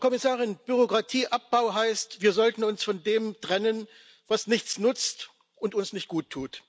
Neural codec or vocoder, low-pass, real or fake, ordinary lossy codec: none; none; real; none